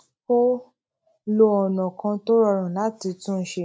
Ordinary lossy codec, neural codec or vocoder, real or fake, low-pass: none; none; real; none